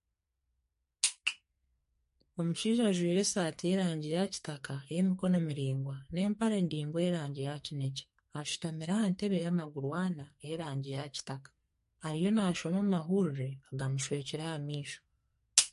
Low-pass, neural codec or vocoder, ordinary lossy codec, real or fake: 14.4 kHz; codec, 44.1 kHz, 2.6 kbps, SNAC; MP3, 48 kbps; fake